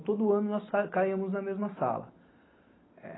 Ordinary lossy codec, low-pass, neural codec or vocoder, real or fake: AAC, 16 kbps; 7.2 kHz; none; real